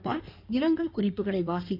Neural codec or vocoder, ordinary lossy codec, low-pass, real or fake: codec, 16 kHz in and 24 kHz out, 1.1 kbps, FireRedTTS-2 codec; none; 5.4 kHz; fake